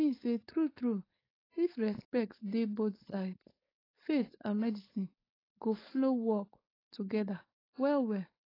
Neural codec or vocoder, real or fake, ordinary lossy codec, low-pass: codec, 16 kHz, 4.8 kbps, FACodec; fake; AAC, 24 kbps; 5.4 kHz